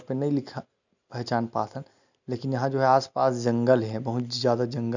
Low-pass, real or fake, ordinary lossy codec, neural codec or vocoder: 7.2 kHz; real; none; none